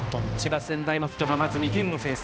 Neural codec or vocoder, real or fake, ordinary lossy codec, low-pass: codec, 16 kHz, 1 kbps, X-Codec, HuBERT features, trained on balanced general audio; fake; none; none